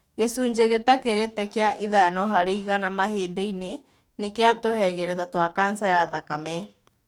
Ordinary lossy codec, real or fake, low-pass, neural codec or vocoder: none; fake; 19.8 kHz; codec, 44.1 kHz, 2.6 kbps, DAC